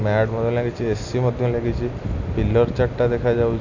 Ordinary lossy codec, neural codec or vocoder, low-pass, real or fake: none; none; 7.2 kHz; real